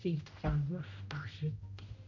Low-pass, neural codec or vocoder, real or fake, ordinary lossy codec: 7.2 kHz; codec, 16 kHz, 0.5 kbps, X-Codec, HuBERT features, trained on general audio; fake; Opus, 64 kbps